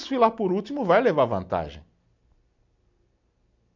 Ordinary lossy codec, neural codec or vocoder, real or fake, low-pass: none; none; real; 7.2 kHz